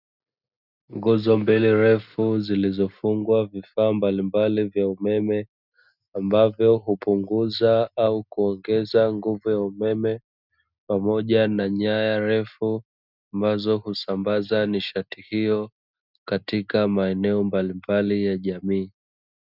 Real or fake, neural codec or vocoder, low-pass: real; none; 5.4 kHz